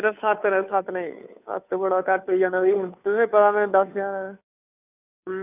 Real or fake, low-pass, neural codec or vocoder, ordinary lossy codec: fake; 3.6 kHz; codec, 16 kHz, 2 kbps, FunCodec, trained on Chinese and English, 25 frames a second; none